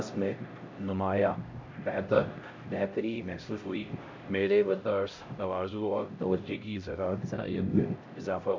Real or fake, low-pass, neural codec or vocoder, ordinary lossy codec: fake; 7.2 kHz; codec, 16 kHz, 0.5 kbps, X-Codec, HuBERT features, trained on LibriSpeech; MP3, 48 kbps